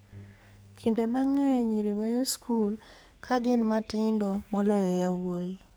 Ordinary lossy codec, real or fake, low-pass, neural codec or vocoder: none; fake; none; codec, 44.1 kHz, 2.6 kbps, SNAC